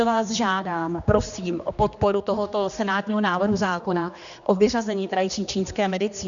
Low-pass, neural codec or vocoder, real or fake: 7.2 kHz; codec, 16 kHz, 2 kbps, X-Codec, HuBERT features, trained on general audio; fake